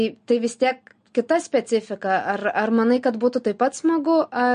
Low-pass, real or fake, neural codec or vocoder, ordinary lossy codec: 9.9 kHz; real; none; MP3, 48 kbps